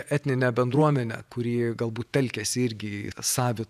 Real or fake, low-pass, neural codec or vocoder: fake; 14.4 kHz; vocoder, 48 kHz, 128 mel bands, Vocos